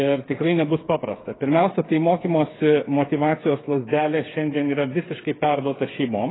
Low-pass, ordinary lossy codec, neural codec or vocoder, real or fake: 7.2 kHz; AAC, 16 kbps; codec, 16 kHz, 8 kbps, FreqCodec, smaller model; fake